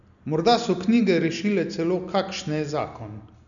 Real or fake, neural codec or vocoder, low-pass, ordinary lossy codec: real; none; 7.2 kHz; none